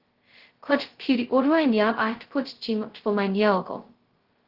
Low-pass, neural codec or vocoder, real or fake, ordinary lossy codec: 5.4 kHz; codec, 16 kHz, 0.2 kbps, FocalCodec; fake; Opus, 16 kbps